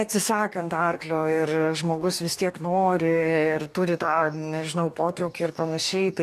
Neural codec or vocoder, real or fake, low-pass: codec, 44.1 kHz, 2.6 kbps, DAC; fake; 14.4 kHz